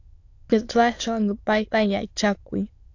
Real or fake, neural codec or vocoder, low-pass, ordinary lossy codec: fake; autoencoder, 22.05 kHz, a latent of 192 numbers a frame, VITS, trained on many speakers; 7.2 kHz; AAC, 48 kbps